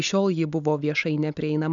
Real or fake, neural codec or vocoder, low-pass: real; none; 7.2 kHz